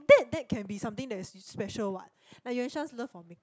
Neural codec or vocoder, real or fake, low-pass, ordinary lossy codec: none; real; none; none